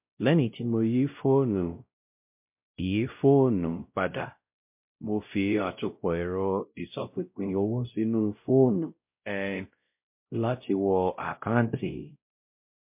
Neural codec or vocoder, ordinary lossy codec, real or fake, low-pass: codec, 16 kHz, 0.5 kbps, X-Codec, WavLM features, trained on Multilingual LibriSpeech; MP3, 32 kbps; fake; 3.6 kHz